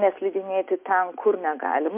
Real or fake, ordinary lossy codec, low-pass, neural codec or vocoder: real; MP3, 32 kbps; 3.6 kHz; none